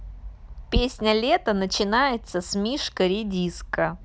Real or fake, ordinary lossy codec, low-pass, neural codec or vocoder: real; none; none; none